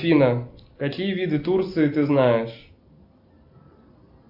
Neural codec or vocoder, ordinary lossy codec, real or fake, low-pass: none; AAC, 48 kbps; real; 5.4 kHz